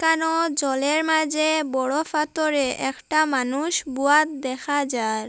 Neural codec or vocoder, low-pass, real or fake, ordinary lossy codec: none; none; real; none